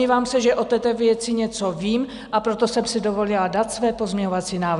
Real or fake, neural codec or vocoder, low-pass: real; none; 10.8 kHz